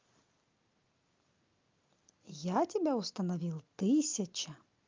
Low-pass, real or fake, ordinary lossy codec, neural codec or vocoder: 7.2 kHz; real; Opus, 24 kbps; none